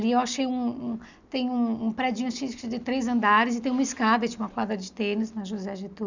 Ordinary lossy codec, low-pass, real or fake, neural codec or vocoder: none; 7.2 kHz; real; none